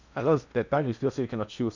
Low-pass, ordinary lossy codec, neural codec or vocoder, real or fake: 7.2 kHz; none; codec, 16 kHz in and 24 kHz out, 0.8 kbps, FocalCodec, streaming, 65536 codes; fake